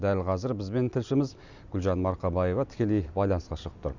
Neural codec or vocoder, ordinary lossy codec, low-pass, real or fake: none; none; 7.2 kHz; real